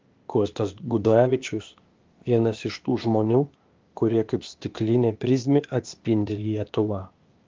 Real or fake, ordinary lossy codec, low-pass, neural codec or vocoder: fake; Opus, 32 kbps; 7.2 kHz; codec, 16 kHz, 0.8 kbps, ZipCodec